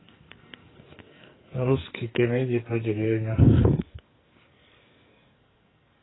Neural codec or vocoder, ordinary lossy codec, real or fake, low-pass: codec, 44.1 kHz, 2.6 kbps, SNAC; AAC, 16 kbps; fake; 7.2 kHz